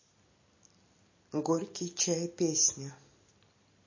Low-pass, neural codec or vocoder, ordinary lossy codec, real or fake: 7.2 kHz; none; MP3, 32 kbps; real